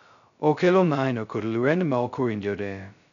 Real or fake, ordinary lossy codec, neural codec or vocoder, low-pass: fake; none; codec, 16 kHz, 0.2 kbps, FocalCodec; 7.2 kHz